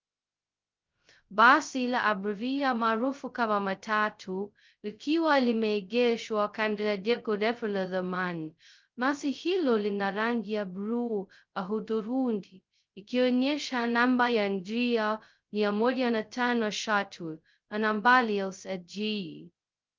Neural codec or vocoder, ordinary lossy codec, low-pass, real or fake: codec, 16 kHz, 0.2 kbps, FocalCodec; Opus, 32 kbps; 7.2 kHz; fake